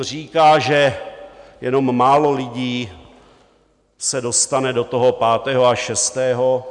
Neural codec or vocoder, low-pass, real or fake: none; 10.8 kHz; real